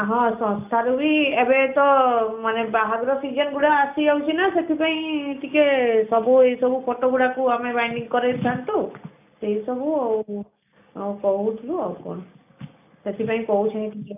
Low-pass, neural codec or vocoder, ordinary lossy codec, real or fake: 3.6 kHz; none; none; real